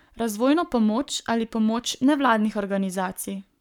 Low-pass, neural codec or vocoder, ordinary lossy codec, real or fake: 19.8 kHz; codec, 44.1 kHz, 7.8 kbps, Pupu-Codec; none; fake